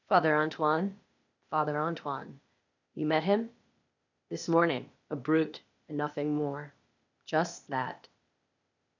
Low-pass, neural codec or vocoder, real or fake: 7.2 kHz; codec, 16 kHz, 0.8 kbps, ZipCodec; fake